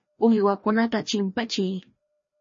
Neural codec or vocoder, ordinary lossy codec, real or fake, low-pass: codec, 16 kHz, 1 kbps, FreqCodec, larger model; MP3, 32 kbps; fake; 7.2 kHz